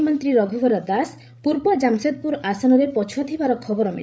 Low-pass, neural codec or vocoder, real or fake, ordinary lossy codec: none; codec, 16 kHz, 16 kbps, FreqCodec, larger model; fake; none